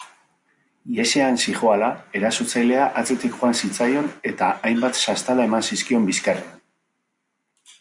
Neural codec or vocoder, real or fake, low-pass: none; real; 10.8 kHz